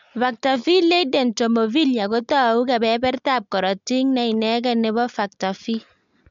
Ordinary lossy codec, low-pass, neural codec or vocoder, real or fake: MP3, 64 kbps; 7.2 kHz; none; real